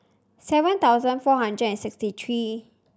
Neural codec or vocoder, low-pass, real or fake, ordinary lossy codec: none; none; real; none